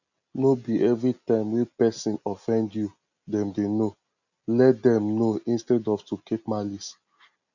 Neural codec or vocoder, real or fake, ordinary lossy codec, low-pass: none; real; none; 7.2 kHz